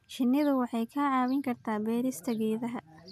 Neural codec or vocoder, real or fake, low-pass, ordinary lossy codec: none; real; 14.4 kHz; none